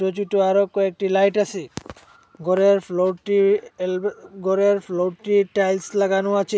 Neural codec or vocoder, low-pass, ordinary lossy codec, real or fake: none; none; none; real